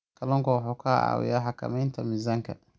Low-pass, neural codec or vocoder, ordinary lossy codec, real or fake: none; none; none; real